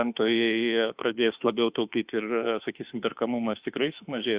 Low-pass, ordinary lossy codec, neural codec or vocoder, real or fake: 3.6 kHz; Opus, 64 kbps; autoencoder, 48 kHz, 32 numbers a frame, DAC-VAE, trained on Japanese speech; fake